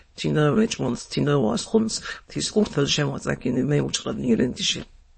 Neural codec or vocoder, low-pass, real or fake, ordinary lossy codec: autoencoder, 22.05 kHz, a latent of 192 numbers a frame, VITS, trained on many speakers; 9.9 kHz; fake; MP3, 32 kbps